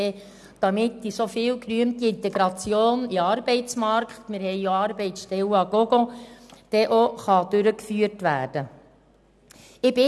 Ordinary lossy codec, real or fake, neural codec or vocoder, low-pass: none; real; none; none